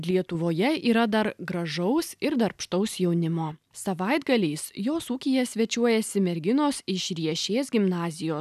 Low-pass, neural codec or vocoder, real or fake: 14.4 kHz; none; real